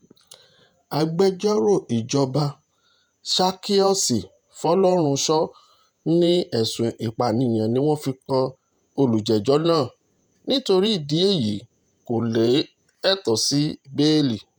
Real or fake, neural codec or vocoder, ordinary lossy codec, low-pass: fake; vocoder, 48 kHz, 128 mel bands, Vocos; none; none